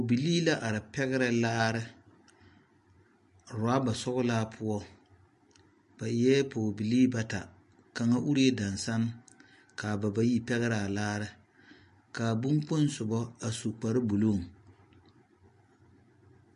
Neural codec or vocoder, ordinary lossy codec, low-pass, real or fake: none; MP3, 48 kbps; 10.8 kHz; real